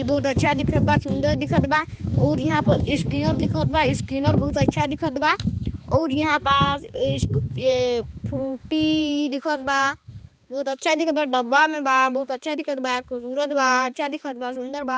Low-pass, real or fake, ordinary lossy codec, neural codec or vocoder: none; fake; none; codec, 16 kHz, 2 kbps, X-Codec, HuBERT features, trained on balanced general audio